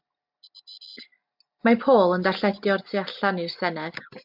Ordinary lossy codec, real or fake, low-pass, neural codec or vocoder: MP3, 48 kbps; real; 5.4 kHz; none